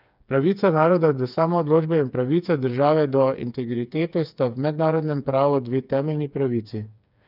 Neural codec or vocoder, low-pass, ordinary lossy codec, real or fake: codec, 16 kHz, 4 kbps, FreqCodec, smaller model; 5.4 kHz; none; fake